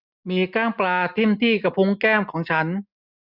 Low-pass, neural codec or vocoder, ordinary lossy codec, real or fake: 5.4 kHz; none; none; real